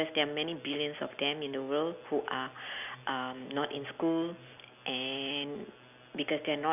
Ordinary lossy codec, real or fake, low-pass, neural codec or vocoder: none; real; 3.6 kHz; none